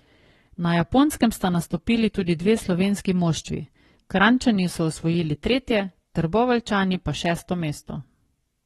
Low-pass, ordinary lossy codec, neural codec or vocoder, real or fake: 19.8 kHz; AAC, 32 kbps; codec, 44.1 kHz, 7.8 kbps, Pupu-Codec; fake